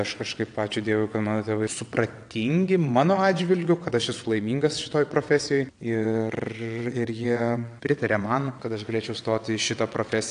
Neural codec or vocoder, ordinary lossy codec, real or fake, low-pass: vocoder, 22.05 kHz, 80 mel bands, WaveNeXt; AAC, 64 kbps; fake; 9.9 kHz